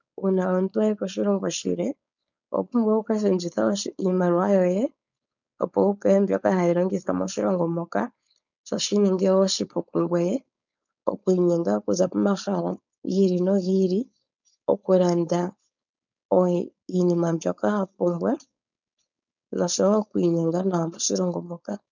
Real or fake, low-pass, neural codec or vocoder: fake; 7.2 kHz; codec, 16 kHz, 4.8 kbps, FACodec